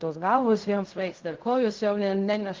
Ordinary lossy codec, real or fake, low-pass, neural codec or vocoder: Opus, 32 kbps; fake; 7.2 kHz; codec, 16 kHz in and 24 kHz out, 0.4 kbps, LongCat-Audio-Codec, fine tuned four codebook decoder